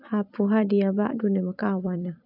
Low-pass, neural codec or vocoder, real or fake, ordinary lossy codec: 5.4 kHz; vocoder, 44.1 kHz, 128 mel bands every 256 samples, BigVGAN v2; fake; none